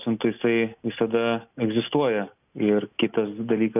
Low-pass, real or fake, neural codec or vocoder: 3.6 kHz; real; none